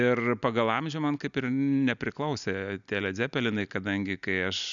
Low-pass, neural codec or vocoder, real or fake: 7.2 kHz; none; real